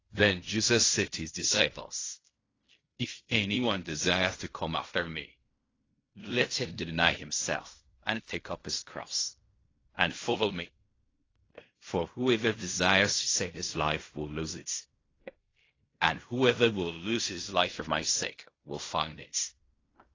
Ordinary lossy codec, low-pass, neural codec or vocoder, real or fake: AAC, 32 kbps; 7.2 kHz; codec, 16 kHz in and 24 kHz out, 0.4 kbps, LongCat-Audio-Codec, fine tuned four codebook decoder; fake